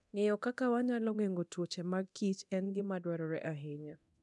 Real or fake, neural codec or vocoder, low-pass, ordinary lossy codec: fake; codec, 24 kHz, 0.9 kbps, DualCodec; 10.8 kHz; none